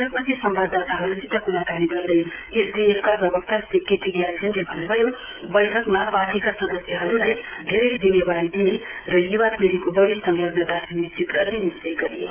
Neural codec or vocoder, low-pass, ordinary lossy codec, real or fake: codec, 16 kHz, 8 kbps, FreqCodec, smaller model; 3.6 kHz; none; fake